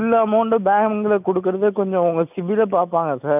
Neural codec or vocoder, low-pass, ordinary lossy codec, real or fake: none; 3.6 kHz; none; real